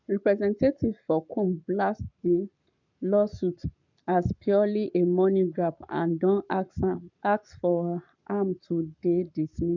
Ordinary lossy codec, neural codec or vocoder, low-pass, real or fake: none; codec, 44.1 kHz, 7.8 kbps, Pupu-Codec; 7.2 kHz; fake